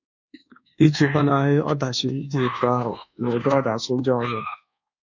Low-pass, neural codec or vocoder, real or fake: 7.2 kHz; codec, 24 kHz, 1.2 kbps, DualCodec; fake